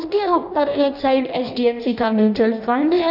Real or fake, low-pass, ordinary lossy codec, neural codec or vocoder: fake; 5.4 kHz; none; codec, 16 kHz in and 24 kHz out, 0.6 kbps, FireRedTTS-2 codec